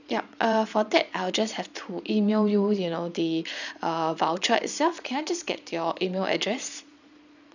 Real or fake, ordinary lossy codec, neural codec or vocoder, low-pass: fake; none; vocoder, 22.05 kHz, 80 mel bands, Vocos; 7.2 kHz